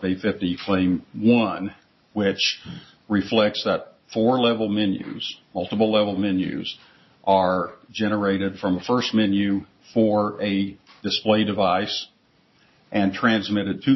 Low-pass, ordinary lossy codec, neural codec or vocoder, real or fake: 7.2 kHz; MP3, 24 kbps; none; real